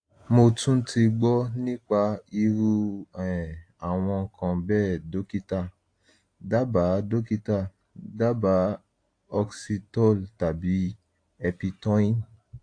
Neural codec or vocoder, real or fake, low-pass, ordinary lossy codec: none; real; 9.9 kHz; AAC, 48 kbps